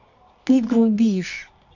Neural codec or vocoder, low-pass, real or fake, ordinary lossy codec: codec, 24 kHz, 0.9 kbps, WavTokenizer, medium music audio release; 7.2 kHz; fake; none